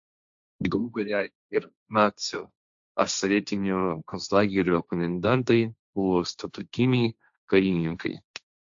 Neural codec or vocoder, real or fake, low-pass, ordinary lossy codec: codec, 16 kHz, 1.1 kbps, Voila-Tokenizer; fake; 7.2 kHz; AAC, 64 kbps